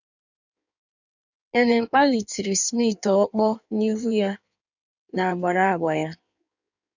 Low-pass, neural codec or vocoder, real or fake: 7.2 kHz; codec, 16 kHz in and 24 kHz out, 1.1 kbps, FireRedTTS-2 codec; fake